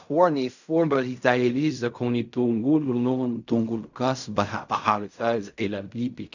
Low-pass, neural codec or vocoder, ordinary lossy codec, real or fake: 7.2 kHz; codec, 16 kHz in and 24 kHz out, 0.4 kbps, LongCat-Audio-Codec, fine tuned four codebook decoder; none; fake